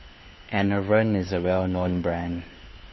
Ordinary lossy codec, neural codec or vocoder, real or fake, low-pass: MP3, 24 kbps; codec, 16 kHz, 4 kbps, FunCodec, trained on LibriTTS, 50 frames a second; fake; 7.2 kHz